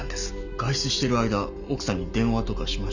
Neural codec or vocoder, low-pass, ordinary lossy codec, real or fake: none; 7.2 kHz; none; real